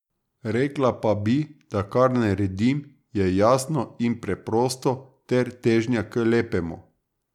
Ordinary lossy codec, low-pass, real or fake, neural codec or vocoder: none; 19.8 kHz; real; none